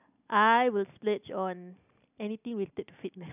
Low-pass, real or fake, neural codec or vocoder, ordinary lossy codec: 3.6 kHz; real; none; none